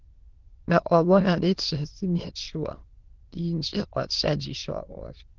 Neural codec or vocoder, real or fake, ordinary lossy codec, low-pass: autoencoder, 22.05 kHz, a latent of 192 numbers a frame, VITS, trained on many speakers; fake; Opus, 16 kbps; 7.2 kHz